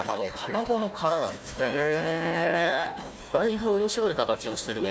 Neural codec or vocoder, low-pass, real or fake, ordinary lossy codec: codec, 16 kHz, 1 kbps, FunCodec, trained on Chinese and English, 50 frames a second; none; fake; none